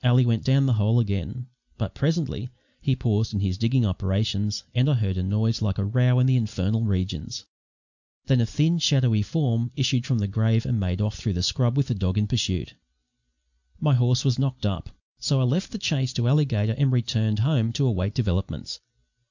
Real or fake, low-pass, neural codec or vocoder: real; 7.2 kHz; none